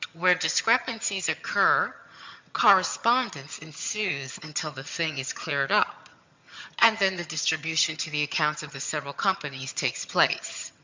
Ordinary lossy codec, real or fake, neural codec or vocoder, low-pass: MP3, 64 kbps; fake; vocoder, 22.05 kHz, 80 mel bands, HiFi-GAN; 7.2 kHz